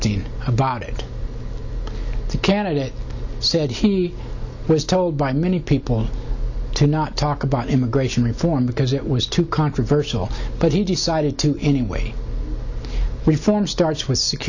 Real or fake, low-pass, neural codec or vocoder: real; 7.2 kHz; none